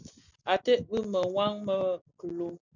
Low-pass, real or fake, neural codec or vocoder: 7.2 kHz; real; none